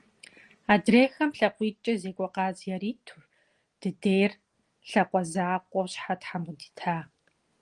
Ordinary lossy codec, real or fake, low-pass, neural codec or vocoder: Opus, 24 kbps; real; 10.8 kHz; none